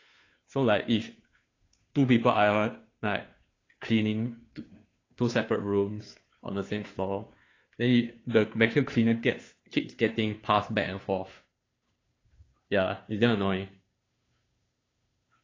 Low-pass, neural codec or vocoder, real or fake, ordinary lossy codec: 7.2 kHz; codec, 16 kHz, 2 kbps, FunCodec, trained on Chinese and English, 25 frames a second; fake; AAC, 32 kbps